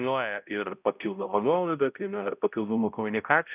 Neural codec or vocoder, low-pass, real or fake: codec, 16 kHz, 0.5 kbps, X-Codec, HuBERT features, trained on balanced general audio; 3.6 kHz; fake